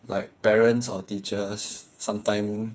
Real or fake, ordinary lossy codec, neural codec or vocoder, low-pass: fake; none; codec, 16 kHz, 4 kbps, FreqCodec, smaller model; none